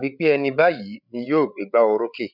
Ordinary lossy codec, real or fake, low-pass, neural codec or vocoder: none; fake; 5.4 kHz; codec, 16 kHz, 8 kbps, FreqCodec, larger model